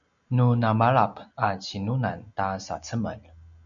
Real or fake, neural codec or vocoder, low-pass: real; none; 7.2 kHz